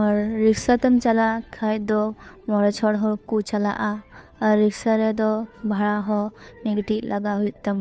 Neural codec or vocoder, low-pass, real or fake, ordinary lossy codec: codec, 16 kHz, 2 kbps, FunCodec, trained on Chinese and English, 25 frames a second; none; fake; none